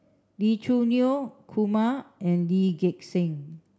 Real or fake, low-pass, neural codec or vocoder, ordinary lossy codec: real; none; none; none